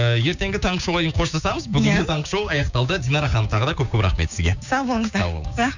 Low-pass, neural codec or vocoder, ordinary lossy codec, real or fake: 7.2 kHz; codec, 16 kHz, 6 kbps, DAC; none; fake